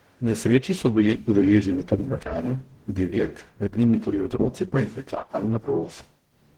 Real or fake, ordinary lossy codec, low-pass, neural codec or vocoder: fake; Opus, 16 kbps; 19.8 kHz; codec, 44.1 kHz, 0.9 kbps, DAC